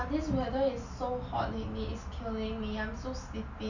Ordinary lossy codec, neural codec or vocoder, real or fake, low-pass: none; none; real; 7.2 kHz